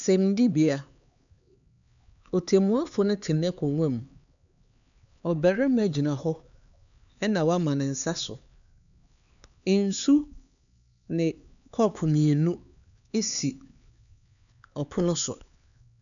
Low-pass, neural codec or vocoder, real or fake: 7.2 kHz; codec, 16 kHz, 4 kbps, X-Codec, HuBERT features, trained on LibriSpeech; fake